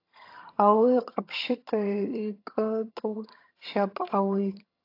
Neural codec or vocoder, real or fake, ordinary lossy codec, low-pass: vocoder, 22.05 kHz, 80 mel bands, HiFi-GAN; fake; AAC, 32 kbps; 5.4 kHz